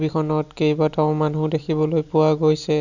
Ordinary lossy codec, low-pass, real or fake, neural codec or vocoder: none; 7.2 kHz; real; none